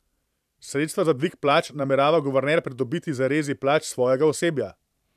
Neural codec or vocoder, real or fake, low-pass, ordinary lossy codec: vocoder, 44.1 kHz, 128 mel bands every 512 samples, BigVGAN v2; fake; 14.4 kHz; none